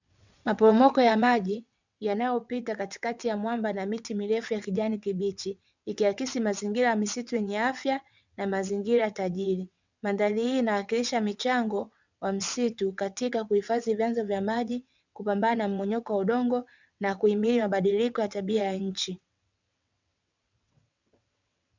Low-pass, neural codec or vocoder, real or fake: 7.2 kHz; vocoder, 22.05 kHz, 80 mel bands, WaveNeXt; fake